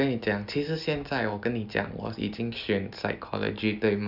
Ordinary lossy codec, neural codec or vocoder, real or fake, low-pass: none; none; real; 5.4 kHz